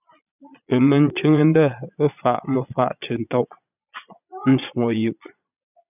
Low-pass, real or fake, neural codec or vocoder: 3.6 kHz; fake; vocoder, 22.05 kHz, 80 mel bands, Vocos